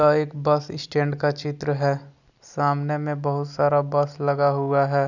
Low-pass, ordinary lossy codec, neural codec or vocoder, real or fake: 7.2 kHz; none; none; real